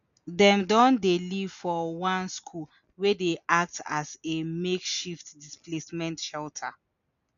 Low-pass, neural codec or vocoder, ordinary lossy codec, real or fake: 7.2 kHz; none; MP3, 96 kbps; real